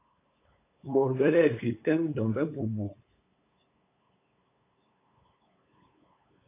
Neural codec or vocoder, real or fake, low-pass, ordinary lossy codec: codec, 16 kHz, 8 kbps, FunCodec, trained on LibriTTS, 25 frames a second; fake; 3.6 kHz; AAC, 24 kbps